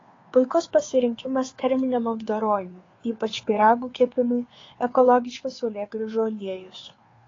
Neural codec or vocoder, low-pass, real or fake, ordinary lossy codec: codec, 16 kHz, 2 kbps, FunCodec, trained on Chinese and English, 25 frames a second; 7.2 kHz; fake; AAC, 32 kbps